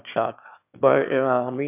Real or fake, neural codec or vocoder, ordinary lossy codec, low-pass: fake; autoencoder, 22.05 kHz, a latent of 192 numbers a frame, VITS, trained on one speaker; none; 3.6 kHz